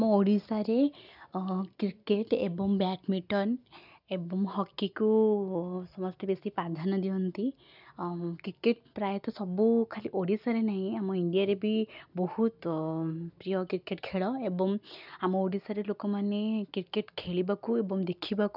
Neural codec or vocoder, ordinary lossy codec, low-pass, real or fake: none; none; 5.4 kHz; real